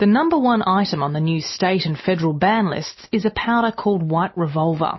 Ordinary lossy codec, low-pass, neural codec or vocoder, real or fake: MP3, 24 kbps; 7.2 kHz; none; real